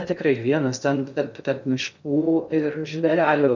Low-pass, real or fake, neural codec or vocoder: 7.2 kHz; fake; codec, 16 kHz in and 24 kHz out, 0.6 kbps, FocalCodec, streaming, 2048 codes